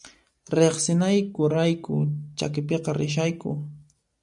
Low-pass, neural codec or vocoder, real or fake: 10.8 kHz; none; real